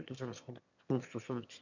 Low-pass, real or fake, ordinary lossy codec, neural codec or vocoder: 7.2 kHz; fake; none; autoencoder, 22.05 kHz, a latent of 192 numbers a frame, VITS, trained on one speaker